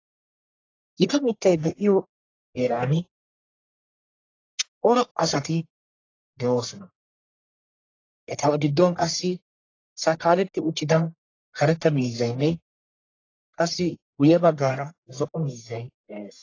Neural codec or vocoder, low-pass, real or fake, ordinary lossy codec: codec, 44.1 kHz, 1.7 kbps, Pupu-Codec; 7.2 kHz; fake; AAC, 32 kbps